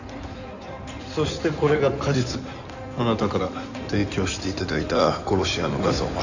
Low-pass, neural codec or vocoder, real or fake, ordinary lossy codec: 7.2 kHz; codec, 16 kHz in and 24 kHz out, 2.2 kbps, FireRedTTS-2 codec; fake; none